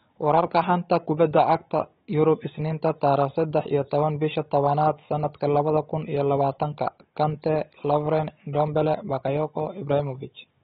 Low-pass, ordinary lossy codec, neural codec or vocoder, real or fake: 7.2 kHz; AAC, 16 kbps; codec, 16 kHz, 16 kbps, FunCodec, trained on Chinese and English, 50 frames a second; fake